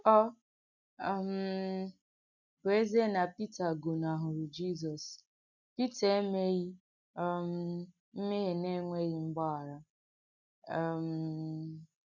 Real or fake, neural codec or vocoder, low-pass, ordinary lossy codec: real; none; 7.2 kHz; none